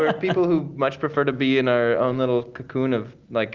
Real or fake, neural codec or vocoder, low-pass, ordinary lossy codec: real; none; 7.2 kHz; Opus, 32 kbps